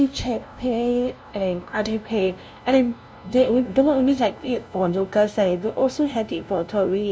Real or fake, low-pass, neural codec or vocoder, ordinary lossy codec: fake; none; codec, 16 kHz, 0.5 kbps, FunCodec, trained on LibriTTS, 25 frames a second; none